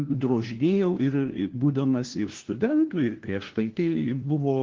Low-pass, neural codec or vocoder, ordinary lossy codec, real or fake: 7.2 kHz; codec, 16 kHz, 1 kbps, FunCodec, trained on LibriTTS, 50 frames a second; Opus, 16 kbps; fake